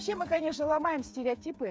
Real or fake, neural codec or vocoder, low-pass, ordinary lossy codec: fake; codec, 16 kHz, 8 kbps, FreqCodec, smaller model; none; none